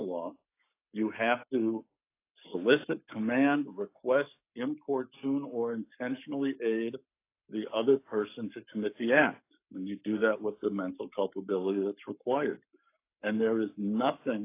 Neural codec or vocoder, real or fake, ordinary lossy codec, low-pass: codec, 44.1 kHz, 7.8 kbps, Pupu-Codec; fake; AAC, 24 kbps; 3.6 kHz